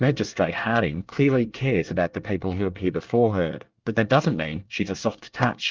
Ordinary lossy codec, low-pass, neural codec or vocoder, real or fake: Opus, 32 kbps; 7.2 kHz; codec, 24 kHz, 1 kbps, SNAC; fake